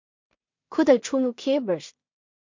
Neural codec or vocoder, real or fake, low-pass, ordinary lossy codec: codec, 16 kHz in and 24 kHz out, 0.4 kbps, LongCat-Audio-Codec, two codebook decoder; fake; 7.2 kHz; MP3, 48 kbps